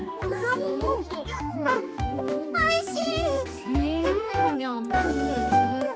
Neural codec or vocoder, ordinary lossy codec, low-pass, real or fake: codec, 16 kHz, 2 kbps, X-Codec, HuBERT features, trained on general audio; none; none; fake